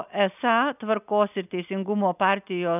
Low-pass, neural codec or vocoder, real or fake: 3.6 kHz; none; real